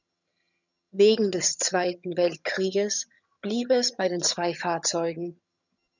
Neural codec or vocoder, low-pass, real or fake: vocoder, 22.05 kHz, 80 mel bands, HiFi-GAN; 7.2 kHz; fake